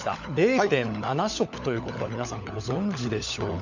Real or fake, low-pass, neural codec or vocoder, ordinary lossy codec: fake; 7.2 kHz; codec, 16 kHz, 16 kbps, FunCodec, trained on LibriTTS, 50 frames a second; none